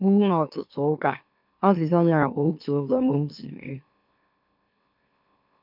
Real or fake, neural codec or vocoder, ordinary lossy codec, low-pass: fake; autoencoder, 44.1 kHz, a latent of 192 numbers a frame, MeloTTS; none; 5.4 kHz